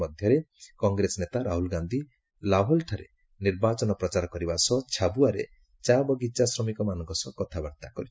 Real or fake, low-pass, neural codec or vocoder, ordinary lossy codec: real; none; none; none